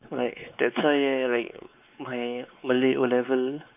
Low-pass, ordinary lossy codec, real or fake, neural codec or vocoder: 3.6 kHz; none; fake; codec, 16 kHz, 4 kbps, X-Codec, WavLM features, trained on Multilingual LibriSpeech